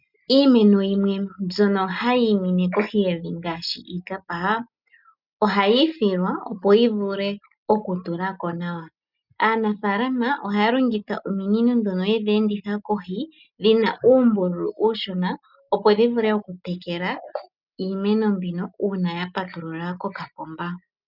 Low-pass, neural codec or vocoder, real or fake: 5.4 kHz; none; real